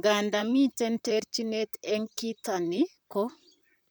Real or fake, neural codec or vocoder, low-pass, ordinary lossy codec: fake; vocoder, 44.1 kHz, 128 mel bands, Pupu-Vocoder; none; none